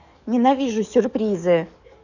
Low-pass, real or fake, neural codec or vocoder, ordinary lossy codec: 7.2 kHz; fake; codec, 44.1 kHz, 7.8 kbps, DAC; none